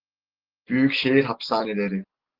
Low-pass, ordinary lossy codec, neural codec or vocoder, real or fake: 5.4 kHz; Opus, 16 kbps; none; real